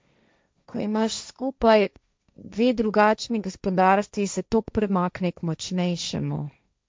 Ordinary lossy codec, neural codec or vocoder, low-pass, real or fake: none; codec, 16 kHz, 1.1 kbps, Voila-Tokenizer; none; fake